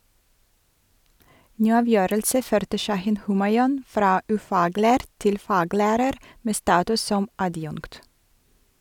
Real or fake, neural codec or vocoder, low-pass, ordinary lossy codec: real; none; 19.8 kHz; none